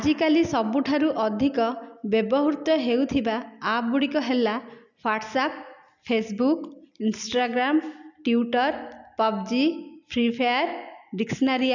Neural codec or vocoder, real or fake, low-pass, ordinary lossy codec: none; real; 7.2 kHz; none